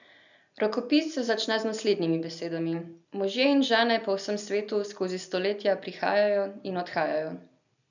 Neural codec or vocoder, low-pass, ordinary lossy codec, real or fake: none; 7.2 kHz; none; real